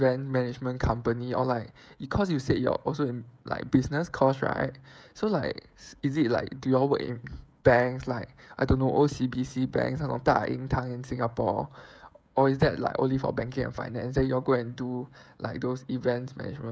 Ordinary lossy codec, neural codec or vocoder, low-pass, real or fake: none; codec, 16 kHz, 16 kbps, FreqCodec, smaller model; none; fake